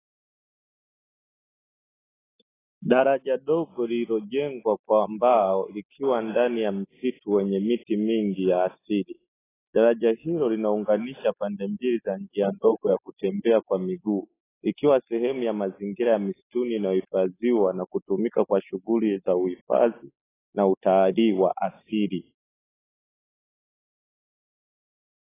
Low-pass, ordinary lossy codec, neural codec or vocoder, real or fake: 3.6 kHz; AAC, 16 kbps; none; real